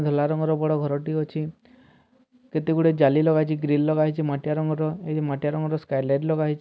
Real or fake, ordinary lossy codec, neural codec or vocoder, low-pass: real; none; none; none